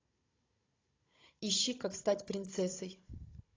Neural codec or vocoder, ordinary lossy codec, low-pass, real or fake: codec, 16 kHz, 16 kbps, FunCodec, trained on Chinese and English, 50 frames a second; AAC, 32 kbps; 7.2 kHz; fake